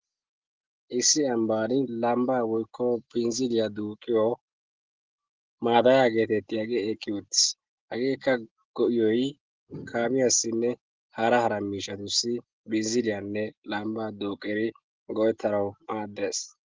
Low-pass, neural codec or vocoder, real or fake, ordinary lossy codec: 7.2 kHz; none; real; Opus, 16 kbps